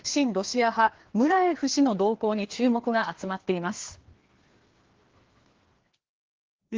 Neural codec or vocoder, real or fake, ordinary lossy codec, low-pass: codec, 16 kHz, 2 kbps, FreqCodec, larger model; fake; Opus, 16 kbps; 7.2 kHz